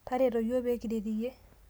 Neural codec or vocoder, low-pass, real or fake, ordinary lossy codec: none; none; real; none